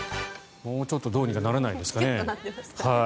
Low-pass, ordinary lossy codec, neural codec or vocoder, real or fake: none; none; none; real